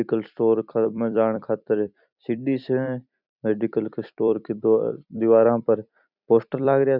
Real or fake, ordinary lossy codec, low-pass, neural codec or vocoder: fake; none; 5.4 kHz; vocoder, 44.1 kHz, 128 mel bands every 256 samples, BigVGAN v2